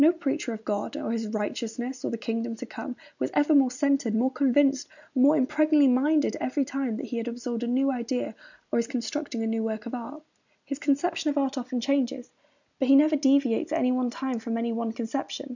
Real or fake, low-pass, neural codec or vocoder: real; 7.2 kHz; none